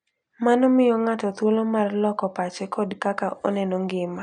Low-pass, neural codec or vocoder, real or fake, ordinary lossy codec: 9.9 kHz; none; real; none